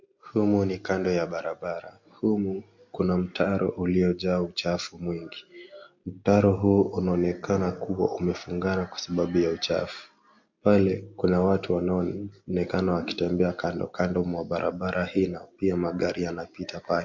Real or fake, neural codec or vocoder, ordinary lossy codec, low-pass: real; none; MP3, 48 kbps; 7.2 kHz